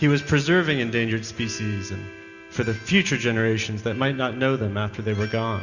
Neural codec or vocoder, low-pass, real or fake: none; 7.2 kHz; real